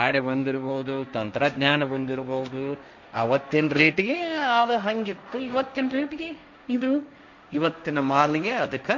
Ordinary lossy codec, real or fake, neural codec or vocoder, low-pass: none; fake; codec, 16 kHz, 1.1 kbps, Voila-Tokenizer; 7.2 kHz